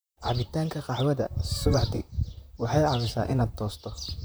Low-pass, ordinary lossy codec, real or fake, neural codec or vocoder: none; none; fake; vocoder, 44.1 kHz, 128 mel bands, Pupu-Vocoder